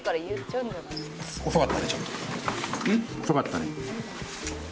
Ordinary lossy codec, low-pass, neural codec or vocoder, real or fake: none; none; none; real